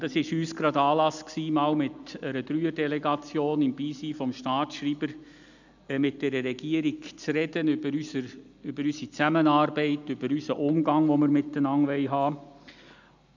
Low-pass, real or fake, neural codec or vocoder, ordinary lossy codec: 7.2 kHz; real; none; none